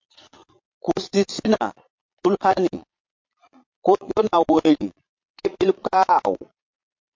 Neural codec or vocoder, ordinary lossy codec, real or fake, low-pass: vocoder, 22.05 kHz, 80 mel bands, Vocos; MP3, 48 kbps; fake; 7.2 kHz